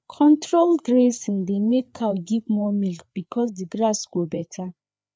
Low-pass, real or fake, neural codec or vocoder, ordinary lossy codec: none; fake; codec, 16 kHz, 4 kbps, FreqCodec, larger model; none